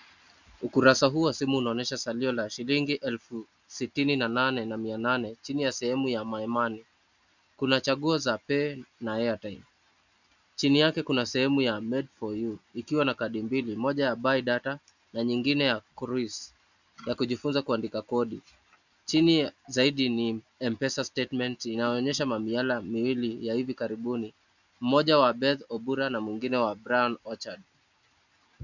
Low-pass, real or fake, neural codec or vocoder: 7.2 kHz; real; none